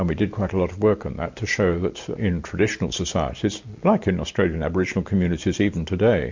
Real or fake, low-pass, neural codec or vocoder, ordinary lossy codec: real; 7.2 kHz; none; MP3, 48 kbps